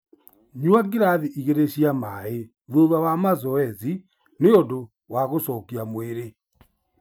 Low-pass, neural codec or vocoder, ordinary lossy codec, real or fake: none; none; none; real